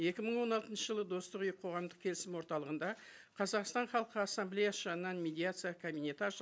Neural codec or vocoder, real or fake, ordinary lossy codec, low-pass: none; real; none; none